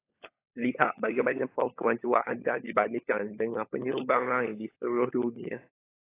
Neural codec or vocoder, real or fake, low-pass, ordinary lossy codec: codec, 16 kHz, 16 kbps, FunCodec, trained on LibriTTS, 50 frames a second; fake; 3.6 kHz; AAC, 24 kbps